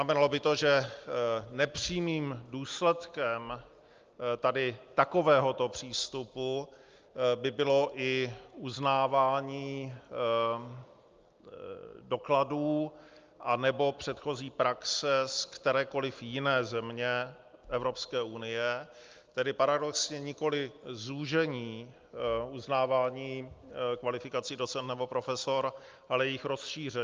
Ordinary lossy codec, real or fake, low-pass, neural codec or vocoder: Opus, 24 kbps; real; 7.2 kHz; none